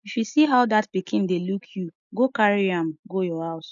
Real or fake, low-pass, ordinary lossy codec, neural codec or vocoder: real; 7.2 kHz; none; none